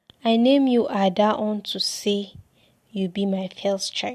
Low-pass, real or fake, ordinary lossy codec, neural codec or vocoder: 14.4 kHz; real; MP3, 64 kbps; none